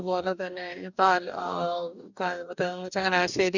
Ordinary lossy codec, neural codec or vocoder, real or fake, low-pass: none; codec, 44.1 kHz, 2.6 kbps, DAC; fake; 7.2 kHz